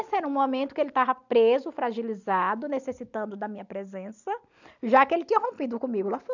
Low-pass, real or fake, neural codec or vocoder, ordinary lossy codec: 7.2 kHz; real; none; none